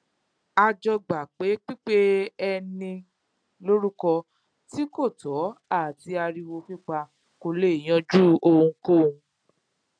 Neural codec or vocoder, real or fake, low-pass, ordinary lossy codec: none; real; 9.9 kHz; none